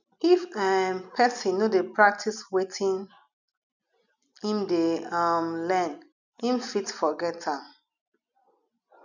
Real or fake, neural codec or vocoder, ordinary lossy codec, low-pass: real; none; none; 7.2 kHz